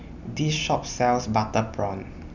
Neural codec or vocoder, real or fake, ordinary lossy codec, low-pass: none; real; none; 7.2 kHz